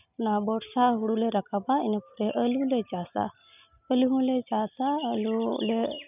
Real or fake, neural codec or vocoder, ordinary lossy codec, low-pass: real; none; none; 3.6 kHz